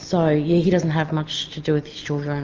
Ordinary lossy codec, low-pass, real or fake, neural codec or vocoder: Opus, 24 kbps; 7.2 kHz; real; none